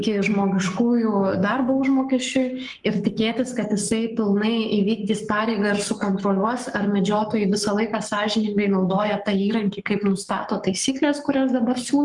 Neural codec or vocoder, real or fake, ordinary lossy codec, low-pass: codec, 44.1 kHz, 7.8 kbps, Pupu-Codec; fake; Opus, 32 kbps; 10.8 kHz